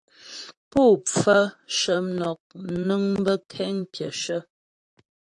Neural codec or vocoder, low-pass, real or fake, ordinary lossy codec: vocoder, 44.1 kHz, 128 mel bands, Pupu-Vocoder; 10.8 kHz; fake; AAC, 64 kbps